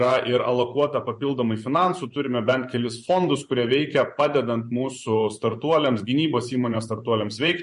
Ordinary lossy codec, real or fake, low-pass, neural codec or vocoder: MP3, 48 kbps; fake; 10.8 kHz; vocoder, 24 kHz, 100 mel bands, Vocos